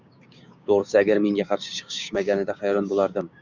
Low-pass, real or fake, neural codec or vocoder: 7.2 kHz; fake; codec, 16 kHz, 6 kbps, DAC